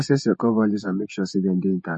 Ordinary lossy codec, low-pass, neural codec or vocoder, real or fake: MP3, 32 kbps; 10.8 kHz; vocoder, 48 kHz, 128 mel bands, Vocos; fake